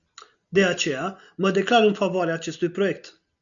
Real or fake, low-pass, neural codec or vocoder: real; 7.2 kHz; none